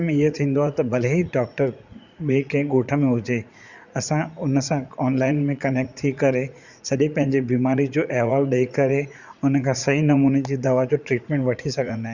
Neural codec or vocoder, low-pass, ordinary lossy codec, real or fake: vocoder, 44.1 kHz, 80 mel bands, Vocos; 7.2 kHz; Opus, 64 kbps; fake